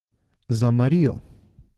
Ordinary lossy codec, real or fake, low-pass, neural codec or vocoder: Opus, 16 kbps; fake; 14.4 kHz; codec, 32 kHz, 1.9 kbps, SNAC